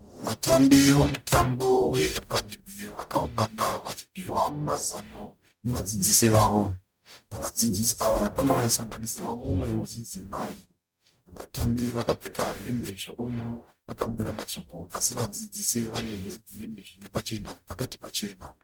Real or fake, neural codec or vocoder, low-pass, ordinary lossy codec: fake; codec, 44.1 kHz, 0.9 kbps, DAC; 19.8 kHz; MP3, 96 kbps